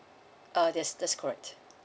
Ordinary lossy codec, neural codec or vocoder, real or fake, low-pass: none; none; real; none